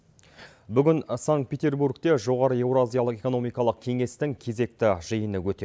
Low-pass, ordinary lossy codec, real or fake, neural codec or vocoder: none; none; real; none